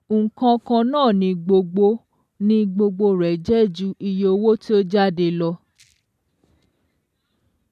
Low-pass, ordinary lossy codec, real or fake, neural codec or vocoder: 14.4 kHz; none; real; none